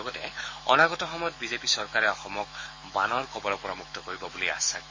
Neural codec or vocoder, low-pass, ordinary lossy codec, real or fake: autoencoder, 48 kHz, 128 numbers a frame, DAC-VAE, trained on Japanese speech; 7.2 kHz; MP3, 32 kbps; fake